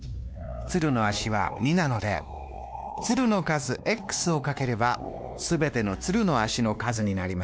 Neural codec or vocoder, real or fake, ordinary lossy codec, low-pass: codec, 16 kHz, 2 kbps, X-Codec, WavLM features, trained on Multilingual LibriSpeech; fake; none; none